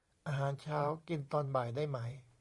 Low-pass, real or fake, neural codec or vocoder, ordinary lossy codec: 10.8 kHz; fake; vocoder, 44.1 kHz, 128 mel bands every 512 samples, BigVGAN v2; Opus, 64 kbps